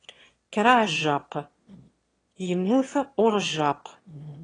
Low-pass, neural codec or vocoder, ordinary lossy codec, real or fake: 9.9 kHz; autoencoder, 22.05 kHz, a latent of 192 numbers a frame, VITS, trained on one speaker; AAC, 32 kbps; fake